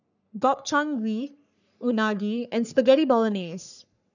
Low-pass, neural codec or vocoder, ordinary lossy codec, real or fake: 7.2 kHz; codec, 44.1 kHz, 3.4 kbps, Pupu-Codec; none; fake